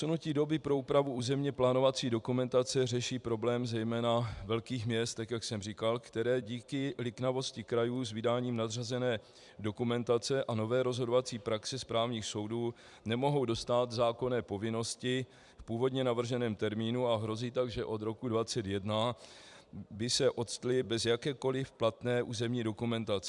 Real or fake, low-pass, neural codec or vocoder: fake; 10.8 kHz; vocoder, 44.1 kHz, 128 mel bands every 256 samples, BigVGAN v2